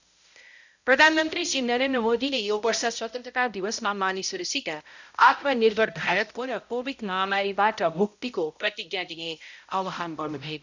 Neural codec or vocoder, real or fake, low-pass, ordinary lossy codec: codec, 16 kHz, 0.5 kbps, X-Codec, HuBERT features, trained on balanced general audio; fake; 7.2 kHz; none